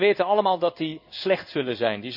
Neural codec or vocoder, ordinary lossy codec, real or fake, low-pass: codec, 16 kHz in and 24 kHz out, 1 kbps, XY-Tokenizer; none; fake; 5.4 kHz